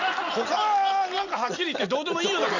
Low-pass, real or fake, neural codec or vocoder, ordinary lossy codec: 7.2 kHz; real; none; none